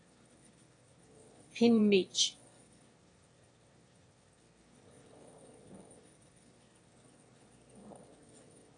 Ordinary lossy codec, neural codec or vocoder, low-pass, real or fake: AAC, 32 kbps; autoencoder, 22.05 kHz, a latent of 192 numbers a frame, VITS, trained on one speaker; 9.9 kHz; fake